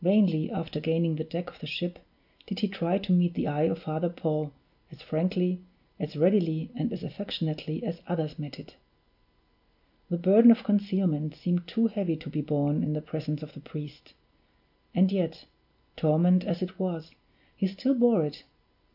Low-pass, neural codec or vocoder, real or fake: 5.4 kHz; none; real